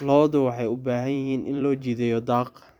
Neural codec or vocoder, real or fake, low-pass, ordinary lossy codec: vocoder, 44.1 kHz, 128 mel bands every 256 samples, BigVGAN v2; fake; 19.8 kHz; none